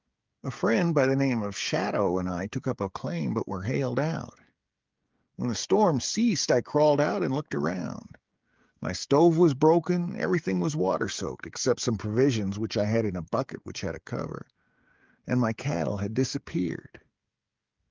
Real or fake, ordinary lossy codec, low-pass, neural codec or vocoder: fake; Opus, 32 kbps; 7.2 kHz; codec, 16 kHz, 16 kbps, FreqCodec, smaller model